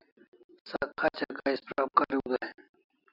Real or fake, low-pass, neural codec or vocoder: real; 5.4 kHz; none